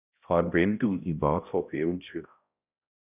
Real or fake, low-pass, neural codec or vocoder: fake; 3.6 kHz; codec, 16 kHz, 0.5 kbps, X-Codec, HuBERT features, trained on balanced general audio